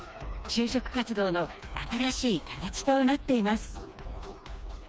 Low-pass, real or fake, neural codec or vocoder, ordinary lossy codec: none; fake; codec, 16 kHz, 2 kbps, FreqCodec, smaller model; none